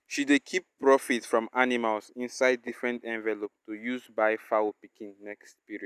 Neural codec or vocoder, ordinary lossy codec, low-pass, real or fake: none; none; 14.4 kHz; real